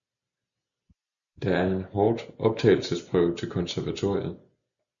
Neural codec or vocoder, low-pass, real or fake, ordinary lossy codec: none; 7.2 kHz; real; MP3, 96 kbps